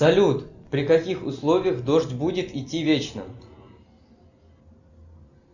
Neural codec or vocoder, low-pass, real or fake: none; 7.2 kHz; real